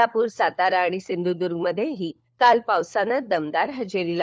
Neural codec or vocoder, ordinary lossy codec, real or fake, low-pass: codec, 16 kHz, 4 kbps, FunCodec, trained on LibriTTS, 50 frames a second; none; fake; none